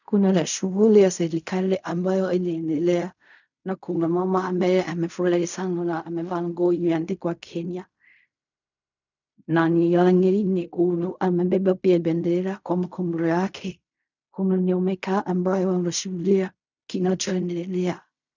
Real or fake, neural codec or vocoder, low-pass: fake; codec, 16 kHz in and 24 kHz out, 0.4 kbps, LongCat-Audio-Codec, fine tuned four codebook decoder; 7.2 kHz